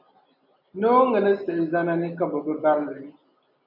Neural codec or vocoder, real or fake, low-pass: none; real; 5.4 kHz